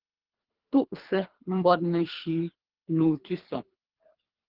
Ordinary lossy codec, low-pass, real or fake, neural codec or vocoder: Opus, 16 kbps; 5.4 kHz; fake; codec, 24 kHz, 3 kbps, HILCodec